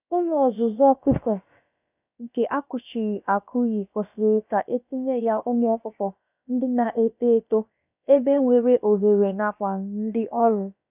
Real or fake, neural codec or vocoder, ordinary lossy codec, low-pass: fake; codec, 16 kHz, about 1 kbps, DyCAST, with the encoder's durations; none; 3.6 kHz